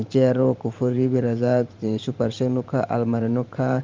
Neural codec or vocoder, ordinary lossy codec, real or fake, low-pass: vocoder, 44.1 kHz, 128 mel bands every 512 samples, BigVGAN v2; Opus, 32 kbps; fake; 7.2 kHz